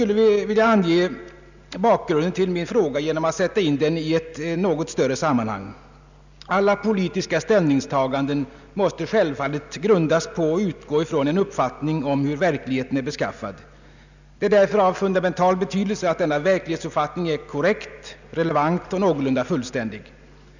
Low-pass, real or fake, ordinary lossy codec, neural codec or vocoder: 7.2 kHz; real; none; none